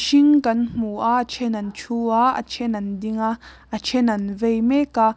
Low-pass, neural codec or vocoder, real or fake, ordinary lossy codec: none; none; real; none